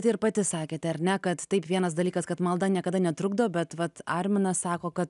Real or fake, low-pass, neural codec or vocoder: real; 10.8 kHz; none